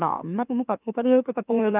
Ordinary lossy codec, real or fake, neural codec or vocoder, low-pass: none; fake; autoencoder, 44.1 kHz, a latent of 192 numbers a frame, MeloTTS; 3.6 kHz